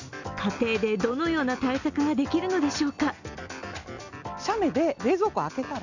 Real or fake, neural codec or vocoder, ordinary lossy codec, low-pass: real; none; none; 7.2 kHz